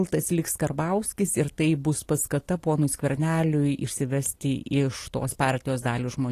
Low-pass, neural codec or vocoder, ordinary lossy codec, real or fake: 14.4 kHz; none; AAC, 48 kbps; real